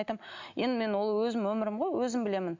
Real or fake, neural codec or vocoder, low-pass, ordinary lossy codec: real; none; 7.2 kHz; MP3, 64 kbps